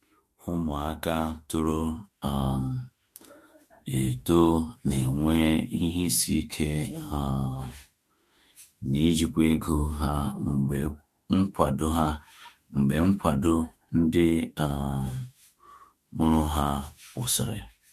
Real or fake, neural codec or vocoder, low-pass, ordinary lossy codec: fake; autoencoder, 48 kHz, 32 numbers a frame, DAC-VAE, trained on Japanese speech; 14.4 kHz; MP3, 64 kbps